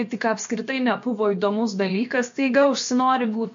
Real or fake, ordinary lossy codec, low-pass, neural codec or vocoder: fake; MP3, 48 kbps; 7.2 kHz; codec, 16 kHz, about 1 kbps, DyCAST, with the encoder's durations